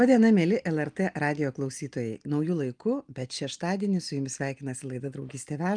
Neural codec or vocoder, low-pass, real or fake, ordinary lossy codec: none; 9.9 kHz; real; Opus, 32 kbps